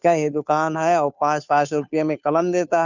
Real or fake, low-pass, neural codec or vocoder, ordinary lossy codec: fake; 7.2 kHz; codec, 16 kHz, 2 kbps, FunCodec, trained on Chinese and English, 25 frames a second; none